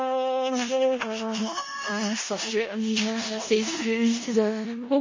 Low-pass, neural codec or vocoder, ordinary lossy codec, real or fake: 7.2 kHz; codec, 16 kHz in and 24 kHz out, 0.4 kbps, LongCat-Audio-Codec, four codebook decoder; MP3, 32 kbps; fake